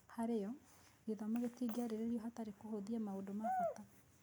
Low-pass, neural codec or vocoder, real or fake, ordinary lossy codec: none; none; real; none